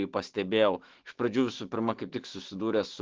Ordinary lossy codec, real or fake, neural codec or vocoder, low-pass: Opus, 16 kbps; fake; autoencoder, 48 kHz, 128 numbers a frame, DAC-VAE, trained on Japanese speech; 7.2 kHz